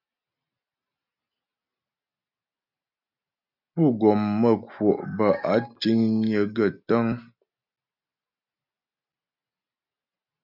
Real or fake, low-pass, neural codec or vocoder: real; 5.4 kHz; none